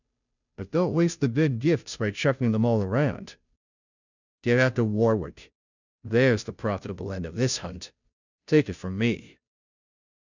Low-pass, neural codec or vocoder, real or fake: 7.2 kHz; codec, 16 kHz, 0.5 kbps, FunCodec, trained on Chinese and English, 25 frames a second; fake